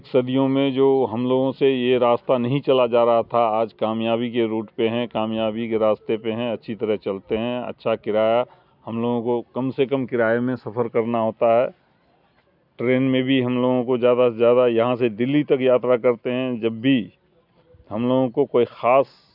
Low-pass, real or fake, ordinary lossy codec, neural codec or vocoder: 5.4 kHz; real; none; none